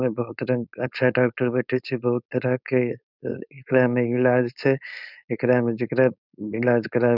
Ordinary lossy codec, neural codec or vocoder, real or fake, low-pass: none; codec, 16 kHz, 4.8 kbps, FACodec; fake; 5.4 kHz